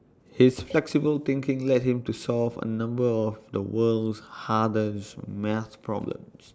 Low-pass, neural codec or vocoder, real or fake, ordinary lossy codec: none; none; real; none